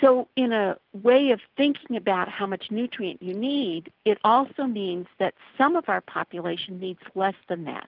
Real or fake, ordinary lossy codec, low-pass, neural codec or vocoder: real; Opus, 24 kbps; 5.4 kHz; none